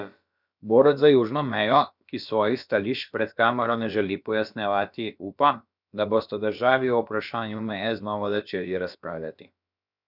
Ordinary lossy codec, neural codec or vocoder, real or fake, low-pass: none; codec, 16 kHz, about 1 kbps, DyCAST, with the encoder's durations; fake; 5.4 kHz